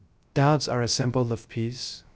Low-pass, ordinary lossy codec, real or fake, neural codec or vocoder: none; none; fake; codec, 16 kHz, 0.3 kbps, FocalCodec